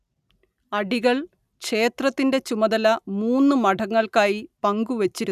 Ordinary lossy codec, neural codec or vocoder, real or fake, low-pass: none; none; real; 14.4 kHz